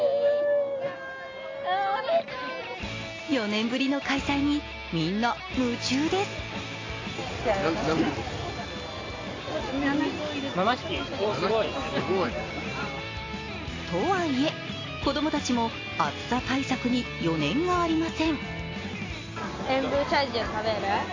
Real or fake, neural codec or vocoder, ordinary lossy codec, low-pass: real; none; AAC, 32 kbps; 7.2 kHz